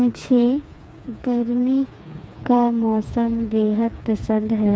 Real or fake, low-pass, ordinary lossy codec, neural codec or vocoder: fake; none; none; codec, 16 kHz, 4 kbps, FreqCodec, smaller model